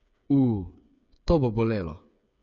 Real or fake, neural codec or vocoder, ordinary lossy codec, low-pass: fake; codec, 16 kHz, 8 kbps, FreqCodec, smaller model; none; 7.2 kHz